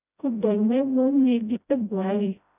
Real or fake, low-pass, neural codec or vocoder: fake; 3.6 kHz; codec, 16 kHz, 0.5 kbps, FreqCodec, smaller model